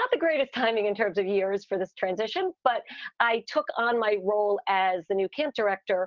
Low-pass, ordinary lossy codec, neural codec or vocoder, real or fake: 7.2 kHz; Opus, 24 kbps; none; real